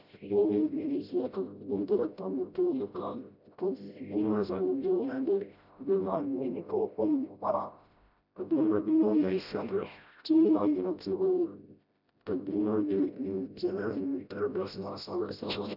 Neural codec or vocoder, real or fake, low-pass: codec, 16 kHz, 0.5 kbps, FreqCodec, smaller model; fake; 5.4 kHz